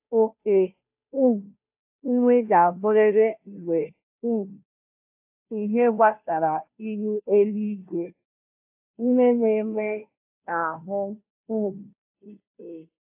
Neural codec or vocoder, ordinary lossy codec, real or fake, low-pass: codec, 16 kHz, 0.5 kbps, FunCodec, trained on Chinese and English, 25 frames a second; AAC, 32 kbps; fake; 3.6 kHz